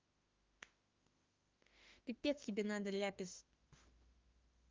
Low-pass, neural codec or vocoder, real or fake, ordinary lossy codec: 7.2 kHz; autoencoder, 48 kHz, 32 numbers a frame, DAC-VAE, trained on Japanese speech; fake; Opus, 32 kbps